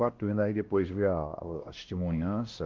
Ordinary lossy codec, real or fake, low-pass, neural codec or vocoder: Opus, 16 kbps; fake; 7.2 kHz; codec, 16 kHz, 1 kbps, X-Codec, WavLM features, trained on Multilingual LibriSpeech